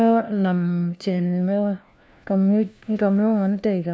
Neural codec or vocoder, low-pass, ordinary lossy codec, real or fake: codec, 16 kHz, 1 kbps, FunCodec, trained on LibriTTS, 50 frames a second; none; none; fake